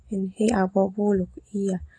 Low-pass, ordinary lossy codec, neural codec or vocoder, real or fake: 9.9 kHz; AAC, 32 kbps; none; real